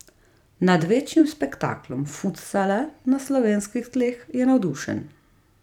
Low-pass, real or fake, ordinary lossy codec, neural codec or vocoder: 19.8 kHz; fake; none; vocoder, 44.1 kHz, 128 mel bands every 256 samples, BigVGAN v2